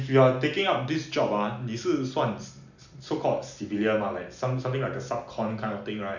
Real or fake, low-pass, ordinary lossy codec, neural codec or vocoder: real; 7.2 kHz; none; none